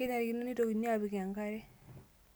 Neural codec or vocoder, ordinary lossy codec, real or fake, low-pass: none; none; real; none